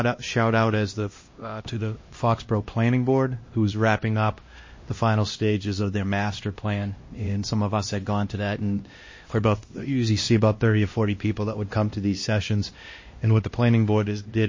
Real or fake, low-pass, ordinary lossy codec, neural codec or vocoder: fake; 7.2 kHz; MP3, 32 kbps; codec, 16 kHz, 1 kbps, X-Codec, HuBERT features, trained on LibriSpeech